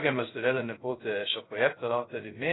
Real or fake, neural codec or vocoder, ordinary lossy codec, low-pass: fake; codec, 16 kHz, 0.2 kbps, FocalCodec; AAC, 16 kbps; 7.2 kHz